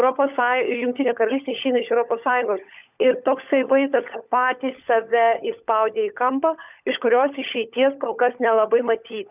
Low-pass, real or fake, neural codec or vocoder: 3.6 kHz; fake; codec, 16 kHz, 16 kbps, FunCodec, trained on LibriTTS, 50 frames a second